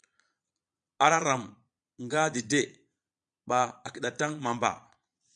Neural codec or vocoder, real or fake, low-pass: vocoder, 22.05 kHz, 80 mel bands, Vocos; fake; 9.9 kHz